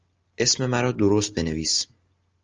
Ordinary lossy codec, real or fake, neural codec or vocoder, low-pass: Opus, 24 kbps; real; none; 7.2 kHz